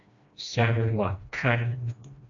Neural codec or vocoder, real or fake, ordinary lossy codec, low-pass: codec, 16 kHz, 1 kbps, FreqCodec, smaller model; fake; AAC, 64 kbps; 7.2 kHz